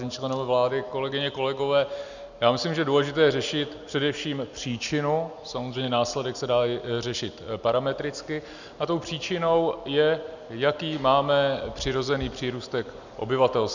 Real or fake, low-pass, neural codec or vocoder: real; 7.2 kHz; none